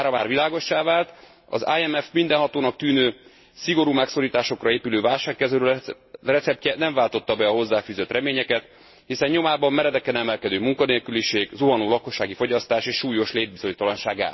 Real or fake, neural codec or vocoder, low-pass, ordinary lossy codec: real; none; 7.2 kHz; MP3, 24 kbps